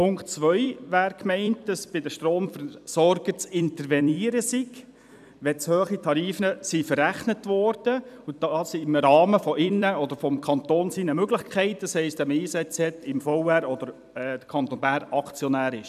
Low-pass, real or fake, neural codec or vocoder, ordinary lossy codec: 14.4 kHz; fake; vocoder, 44.1 kHz, 128 mel bands every 256 samples, BigVGAN v2; none